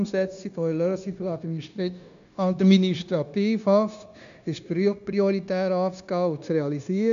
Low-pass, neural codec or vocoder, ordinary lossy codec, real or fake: 7.2 kHz; codec, 16 kHz, 0.9 kbps, LongCat-Audio-Codec; none; fake